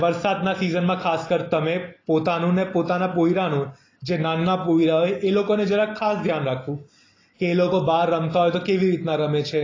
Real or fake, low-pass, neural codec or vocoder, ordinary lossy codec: real; 7.2 kHz; none; AAC, 32 kbps